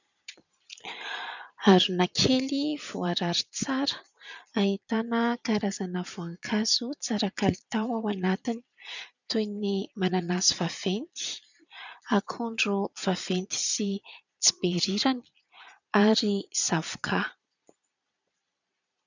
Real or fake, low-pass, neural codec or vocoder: fake; 7.2 kHz; vocoder, 22.05 kHz, 80 mel bands, Vocos